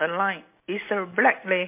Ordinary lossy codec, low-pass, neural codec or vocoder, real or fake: MP3, 24 kbps; 3.6 kHz; none; real